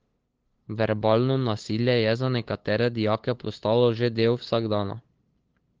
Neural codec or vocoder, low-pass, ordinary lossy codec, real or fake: codec, 16 kHz, 8 kbps, FunCodec, trained on LibriTTS, 25 frames a second; 7.2 kHz; Opus, 16 kbps; fake